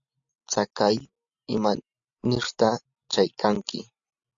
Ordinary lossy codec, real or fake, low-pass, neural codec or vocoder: AAC, 64 kbps; real; 7.2 kHz; none